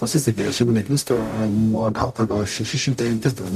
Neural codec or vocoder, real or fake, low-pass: codec, 44.1 kHz, 0.9 kbps, DAC; fake; 14.4 kHz